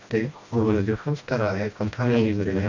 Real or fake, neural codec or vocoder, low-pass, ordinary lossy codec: fake; codec, 16 kHz, 1 kbps, FreqCodec, smaller model; 7.2 kHz; MP3, 64 kbps